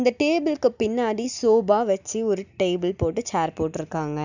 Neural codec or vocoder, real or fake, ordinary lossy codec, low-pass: none; real; none; 7.2 kHz